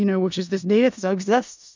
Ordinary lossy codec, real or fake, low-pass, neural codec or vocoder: MP3, 64 kbps; fake; 7.2 kHz; codec, 16 kHz in and 24 kHz out, 0.4 kbps, LongCat-Audio-Codec, four codebook decoder